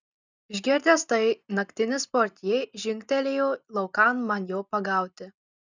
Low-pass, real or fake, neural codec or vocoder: 7.2 kHz; real; none